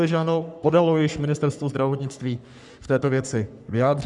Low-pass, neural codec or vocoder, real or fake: 10.8 kHz; codec, 44.1 kHz, 3.4 kbps, Pupu-Codec; fake